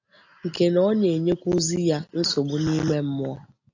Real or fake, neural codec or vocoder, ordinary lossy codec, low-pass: real; none; AAC, 48 kbps; 7.2 kHz